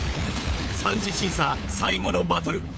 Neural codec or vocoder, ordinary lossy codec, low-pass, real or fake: codec, 16 kHz, 4 kbps, FunCodec, trained on LibriTTS, 50 frames a second; none; none; fake